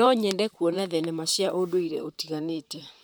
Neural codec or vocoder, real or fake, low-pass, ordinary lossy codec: vocoder, 44.1 kHz, 128 mel bands, Pupu-Vocoder; fake; none; none